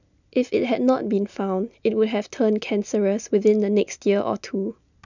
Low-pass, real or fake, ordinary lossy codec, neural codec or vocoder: 7.2 kHz; real; none; none